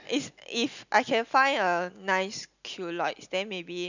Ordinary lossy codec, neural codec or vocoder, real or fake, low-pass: none; none; real; 7.2 kHz